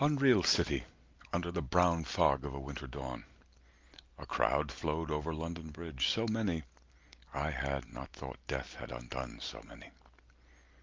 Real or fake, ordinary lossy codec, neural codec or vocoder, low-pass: real; Opus, 24 kbps; none; 7.2 kHz